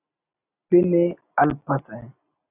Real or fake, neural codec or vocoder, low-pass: real; none; 3.6 kHz